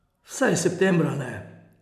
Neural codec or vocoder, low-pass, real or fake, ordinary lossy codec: none; 14.4 kHz; real; none